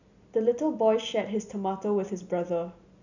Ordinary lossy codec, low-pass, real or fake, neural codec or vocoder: none; 7.2 kHz; real; none